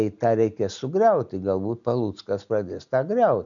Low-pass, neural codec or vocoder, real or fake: 7.2 kHz; none; real